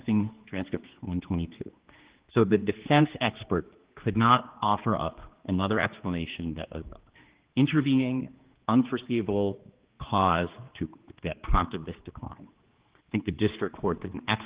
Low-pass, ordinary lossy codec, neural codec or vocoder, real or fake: 3.6 kHz; Opus, 16 kbps; codec, 16 kHz, 2 kbps, X-Codec, HuBERT features, trained on general audio; fake